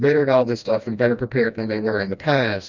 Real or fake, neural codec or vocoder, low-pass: fake; codec, 16 kHz, 1 kbps, FreqCodec, smaller model; 7.2 kHz